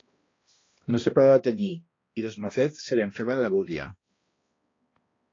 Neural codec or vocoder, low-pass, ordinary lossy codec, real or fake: codec, 16 kHz, 1 kbps, X-Codec, HuBERT features, trained on balanced general audio; 7.2 kHz; AAC, 32 kbps; fake